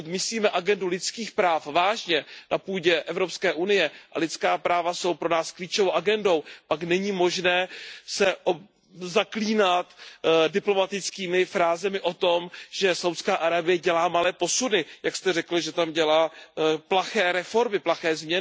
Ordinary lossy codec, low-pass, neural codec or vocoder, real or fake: none; none; none; real